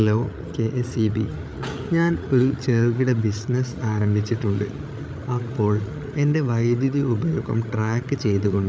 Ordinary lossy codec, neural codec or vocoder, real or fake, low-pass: none; codec, 16 kHz, 8 kbps, FreqCodec, larger model; fake; none